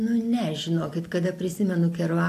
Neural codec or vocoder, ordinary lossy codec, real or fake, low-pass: none; AAC, 64 kbps; real; 14.4 kHz